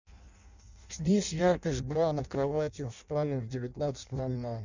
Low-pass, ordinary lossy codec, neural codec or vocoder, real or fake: 7.2 kHz; Opus, 64 kbps; codec, 16 kHz in and 24 kHz out, 0.6 kbps, FireRedTTS-2 codec; fake